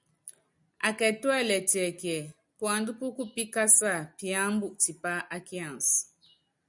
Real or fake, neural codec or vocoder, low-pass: real; none; 10.8 kHz